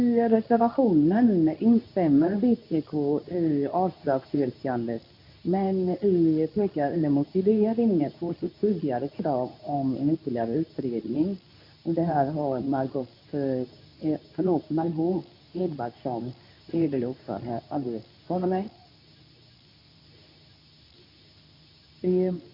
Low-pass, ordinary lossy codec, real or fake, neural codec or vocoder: 5.4 kHz; none; fake; codec, 24 kHz, 0.9 kbps, WavTokenizer, medium speech release version 2